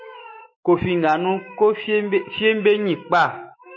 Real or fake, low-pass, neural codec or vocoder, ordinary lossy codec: fake; 7.2 kHz; autoencoder, 48 kHz, 128 numbers a frame, DAC-VAE, trained on Japanese speech; MP3, 32 kbps